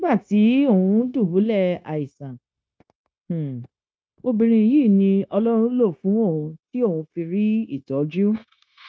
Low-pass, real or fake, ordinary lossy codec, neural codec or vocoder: none; fake; none; codec, 16 kHz, 0.9 kbps, LongCat-Audio-Codec